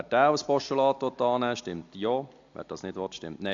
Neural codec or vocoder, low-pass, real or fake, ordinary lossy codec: none; 7.2 kHz; real; AAC, 48 kbps